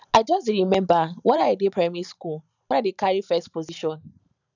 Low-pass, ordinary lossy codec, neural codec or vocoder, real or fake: 7.2 kHz; none; vocoder, 44.1 kHz, 128 mel bands every 512 samples, BigVGAN v2; fake